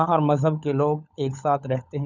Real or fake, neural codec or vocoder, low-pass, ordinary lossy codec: fake; codec, 16 kHz, 16 kbps, FunCodec, trained on LibriTTS, 50 frames a second; 7.2 kHz; none